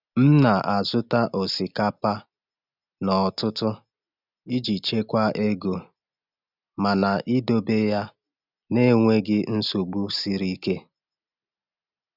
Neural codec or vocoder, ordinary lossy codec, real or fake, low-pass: none; none; real; 5.4 kHz